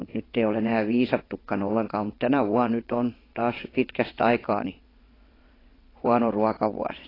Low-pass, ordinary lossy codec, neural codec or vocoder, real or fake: 5.4 kHz; AAC, 24 kbps; vocoder, 22.05 kHz, 80 mel bands, WaveNeXt; fake